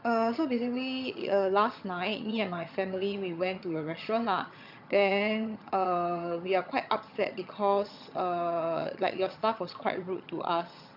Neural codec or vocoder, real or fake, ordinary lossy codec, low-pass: vocoder, 22.05 kHz, 80 mel bands, HiFi-GAN; fake; MP3, 48 kbps; 5.4 kHz